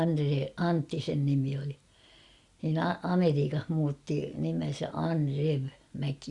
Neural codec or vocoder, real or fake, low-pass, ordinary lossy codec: none; real; 10.8 kHz; Opus, 64 kbps